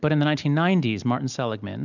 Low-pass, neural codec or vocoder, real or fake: 7.2 kHz; none; real